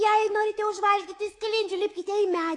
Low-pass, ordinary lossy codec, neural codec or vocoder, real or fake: 10.8 kHz; AAC, 48 kbps; codec, 24 kHz, 3.1 kbps, DualCodec; fake